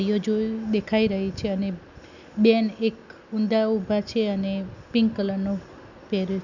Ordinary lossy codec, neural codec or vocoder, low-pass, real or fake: none; none; 7.2 kHz; real